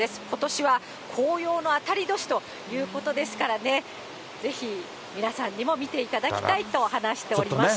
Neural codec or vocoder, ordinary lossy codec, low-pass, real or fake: none; none; none; real